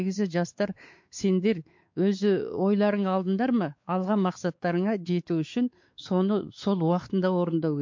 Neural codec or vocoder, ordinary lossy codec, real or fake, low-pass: codec, 16 kHz, 4 kbps, X-Codec, WavLM features, trained on Multilingual LibriSpeech; MP3, 48 kbps; fake; 7.2 kHz